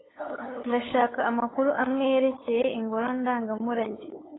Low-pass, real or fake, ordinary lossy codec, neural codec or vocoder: 7.2 kHz; fake; AAC, 16 kbps; codec, 16 kHz, 8 kbps, FunCodec, trained on LibriTTS, 25 frames a second